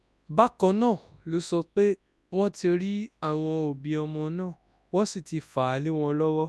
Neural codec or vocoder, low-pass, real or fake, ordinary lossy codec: codec, 24 kHz, 0.9 kbps, WavTokenizer, large speech release; none; fake; none